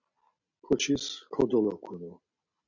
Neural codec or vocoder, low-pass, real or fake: none; 7.2 kHz; real